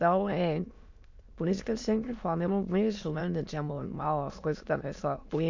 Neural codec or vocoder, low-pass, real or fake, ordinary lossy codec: autoencoder, 22.05 kHz, a latent of 192 numbers a frame, VITS, trained on many speakers; 7.2 kHz; fake; MP3, 64 kbps